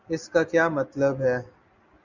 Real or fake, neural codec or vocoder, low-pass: real; none; 7.2 kHz